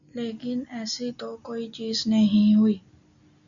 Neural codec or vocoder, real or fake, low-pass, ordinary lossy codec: none; real; 7.2 kHz; MP3, 96 kbps